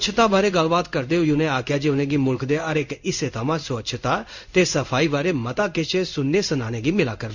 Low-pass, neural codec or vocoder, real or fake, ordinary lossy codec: 7.2 kHz; codec, 16 kHz in and 24 kHz out, 1 kbps, XY-Tokenizer; fake; none